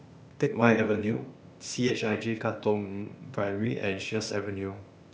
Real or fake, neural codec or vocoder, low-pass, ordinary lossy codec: fake; codec, 16 kHz, 0.8 kbps, ZipCodec; none; none